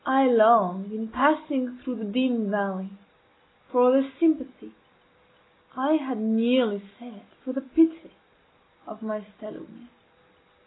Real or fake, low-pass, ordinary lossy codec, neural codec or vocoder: real; 7.2 kHz; AAC, 16 kbps; none